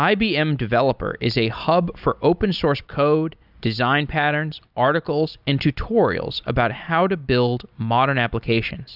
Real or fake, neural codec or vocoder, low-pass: real; none; 5.4 kHz